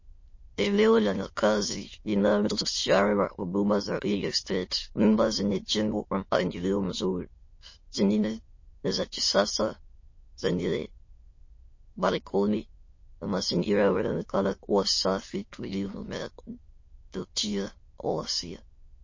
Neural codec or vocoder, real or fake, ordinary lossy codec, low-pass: autoencoder, 22.05 kHz, a latent of 192 numbers a frame, VITS, trained on many speakers; fake; MP3, 32 kbps; 7.2 kHz